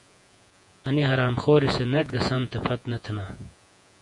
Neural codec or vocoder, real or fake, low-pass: vocoder, 48 kHz, 128 mel bands, Vocos; fake; 10.8 kHz